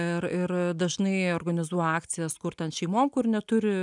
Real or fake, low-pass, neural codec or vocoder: real; 10.8 kHz; none